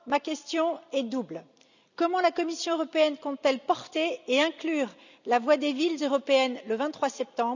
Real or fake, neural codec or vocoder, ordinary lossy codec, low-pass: real; none; none; 7.2 kHz